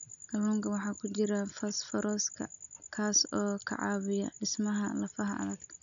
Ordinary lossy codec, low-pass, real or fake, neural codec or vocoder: none; 7.2 kHz; real; none